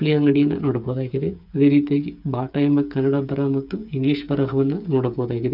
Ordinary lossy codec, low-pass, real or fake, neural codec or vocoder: none; 5.4 kHz; fake; codec, 16 kHz, 8 kbps, FreqCodec, smaller model